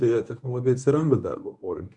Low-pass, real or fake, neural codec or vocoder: 10.8 kHz; fake; codec, 24 kHz, 0.9 kbps, WavTokenizer, medium speech release version 1